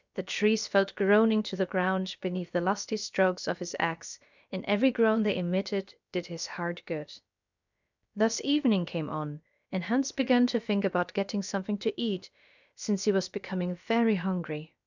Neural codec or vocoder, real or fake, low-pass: codec, 16 kHz, about 1 kbps, DyCAST, with the encoder's durations; fake; 7.2 kHz